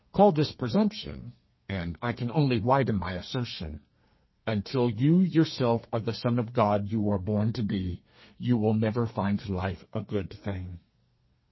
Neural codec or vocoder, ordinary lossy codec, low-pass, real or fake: codec, 32 kHz, 1.9 kbps, SNAC; MP3, 24 kbps; 7.2 kHz; fake